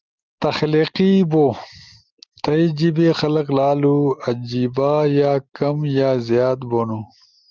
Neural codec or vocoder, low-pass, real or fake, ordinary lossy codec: none; 7.2 kHz; real; Opus, 32 kbps